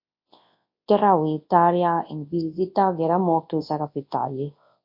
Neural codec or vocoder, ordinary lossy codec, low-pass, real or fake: codec, 24 kHz, 0.9 kbps, WavTokenizer, large speech release; MP3, 32 kbps; 5.4 kHz; fake